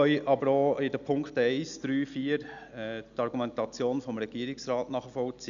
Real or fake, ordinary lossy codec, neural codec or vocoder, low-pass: real; none; none; 7.2 kHz